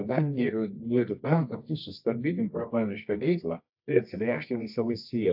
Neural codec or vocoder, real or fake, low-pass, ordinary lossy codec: codec, 24 kHz, 0.9 kbps, WavTokenizer, medium music audio release; fake; 5.4 kHz; AAC, 48 kbps